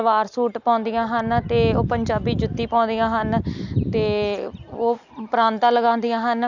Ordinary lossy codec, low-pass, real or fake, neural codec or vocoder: none; 7.2 kHz; real; none